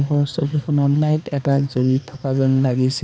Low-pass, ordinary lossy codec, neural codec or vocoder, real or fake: none; none; codec, 16 kHz, 2 kbps, X-Codec, HuBERT features, trained on balanced general audio; fake